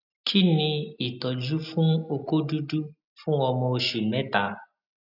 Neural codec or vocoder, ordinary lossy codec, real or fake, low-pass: none; none; real; 5.4 kHz